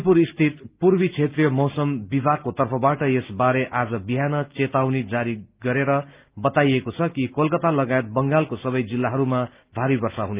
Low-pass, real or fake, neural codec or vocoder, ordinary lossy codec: 3.6 kHz; real; none; Opus, 24 kbps